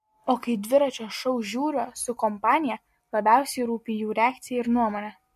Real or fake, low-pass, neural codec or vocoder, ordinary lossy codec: real; 14.4 kHz; none; MP3, 64 kbps